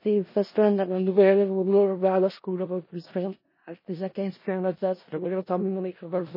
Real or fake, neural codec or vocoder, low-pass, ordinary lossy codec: fake; codec, 16 kHz in and 24 kHz out, 0.4 kbps, LongCat-Audio-Codec, four codebook decoder; 5.4 kHz; MP3, 24 kbps